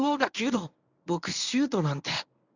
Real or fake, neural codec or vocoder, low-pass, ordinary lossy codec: fake; codec, 24 kHz, 0.9 kbps, WavTokenizer, medium speech release version 1; 7.2 kHz; none